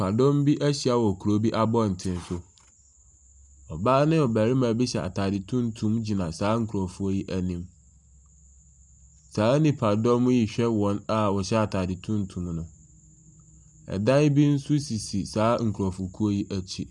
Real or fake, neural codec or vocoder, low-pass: real; none; 10.8 kHz